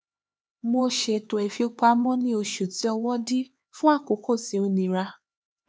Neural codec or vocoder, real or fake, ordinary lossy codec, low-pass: codec, 16 kHz, 4 kbps, X-Codec, HuBERT features, trained on LibriSpeech; fake; none; none